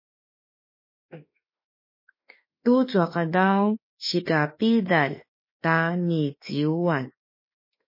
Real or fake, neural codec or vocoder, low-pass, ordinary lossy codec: fake; autoencoder, 48 kHz, 32 numbers a frame, DAC-VAE, trained on Japanese speech; 5.4 kHz; MP3, 24 kbps